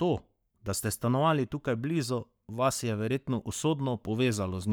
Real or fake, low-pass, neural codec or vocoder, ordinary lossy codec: fake; none; codec, 44.1 kHz, 7.8 kbps, Pupu-Codec; none